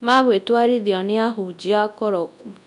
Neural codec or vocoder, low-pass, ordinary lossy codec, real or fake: codec, 24 kHz, 0.9 kbps, WavTokenizer, large speech release; 10.8 kHz; none; fake